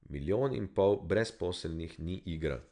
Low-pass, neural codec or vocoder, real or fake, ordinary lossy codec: 9.9 kHz; none; real; none